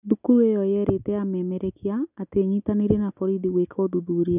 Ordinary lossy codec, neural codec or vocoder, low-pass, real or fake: none; none; 3.6 kHz; real